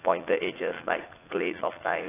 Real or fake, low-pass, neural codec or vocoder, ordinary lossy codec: fake; 3.6 kHz; vocoder, 44.1 kHz, 80 mel bands, Vocos; AAC, 16 kbps